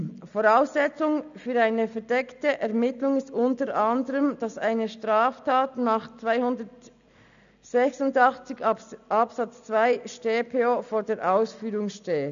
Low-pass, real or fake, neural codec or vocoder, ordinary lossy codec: 7.2 kHz; real; none; none